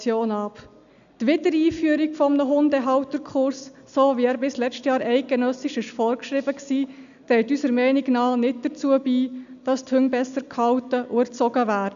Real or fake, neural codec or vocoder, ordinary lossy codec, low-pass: real; none; none; 7.2 kHz